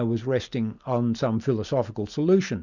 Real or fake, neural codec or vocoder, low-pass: real; none; 7.2 kHz